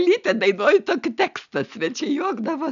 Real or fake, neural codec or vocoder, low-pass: real; none; 7.2 kHz